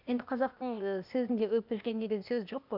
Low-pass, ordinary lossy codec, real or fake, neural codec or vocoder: 5.4 kHz; none; fake; codec, 16 kHz, 0.8 kbps, ZipCodec